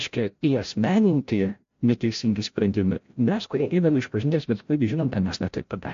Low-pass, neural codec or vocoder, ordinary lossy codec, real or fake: 7.2 kHz; codec, 16 kHz, 0.5 kbps, FreqCodec, larger model; AAC, 48 kbps; fake